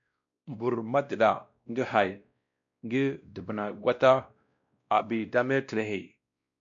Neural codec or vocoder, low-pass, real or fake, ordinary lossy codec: codec, 16 kHz, 1 kbps, X-Codec, WavLM features, trained on Multilingual LibriSpeech; 7.2 kHz; fake; MP3, 64 kbps